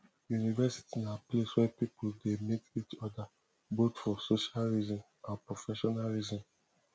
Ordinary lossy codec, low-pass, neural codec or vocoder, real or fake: none; none; none; real